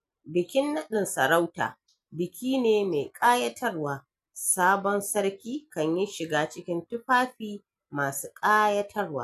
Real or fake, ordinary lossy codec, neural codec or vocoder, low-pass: real; none; none; 14.4 kHz